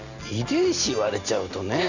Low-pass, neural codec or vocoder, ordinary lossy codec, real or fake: 7.2 kHz; none; none; real